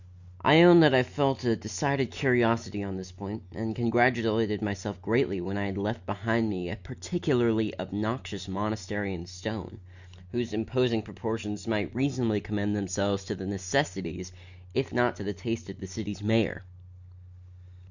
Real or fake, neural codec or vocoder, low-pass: real; none; 7.2 kHz